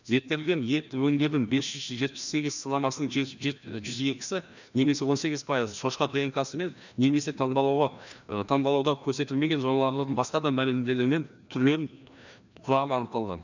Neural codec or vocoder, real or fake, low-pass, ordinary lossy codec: codec, 16 kHz, 1 kbps, FreqCodec, larger model; fake; 7.2 kHz; none